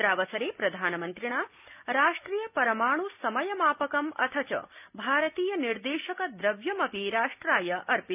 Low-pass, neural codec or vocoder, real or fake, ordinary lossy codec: 3.6 kHz; none; real; none